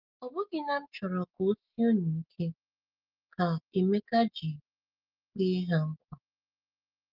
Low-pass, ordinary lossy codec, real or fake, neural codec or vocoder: 5.4 kHz; Opus, 32 kbps; real; none